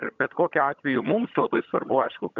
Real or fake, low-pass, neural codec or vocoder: fake; 7.2 kHz; vocoder, 22.05 kHz, 80 mel bands, HiFi-GAN